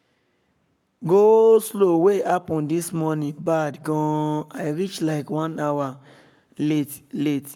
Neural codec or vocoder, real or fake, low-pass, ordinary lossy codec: codec, 44.1 kHz, 7.8 kbps, Pupu-Codec; fake; 19.8 kHz; none